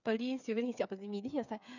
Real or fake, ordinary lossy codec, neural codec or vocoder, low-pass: fake; none; codec, 16 kHz, 8 kbps, FreqCodec, smaller model; 7.2 kHz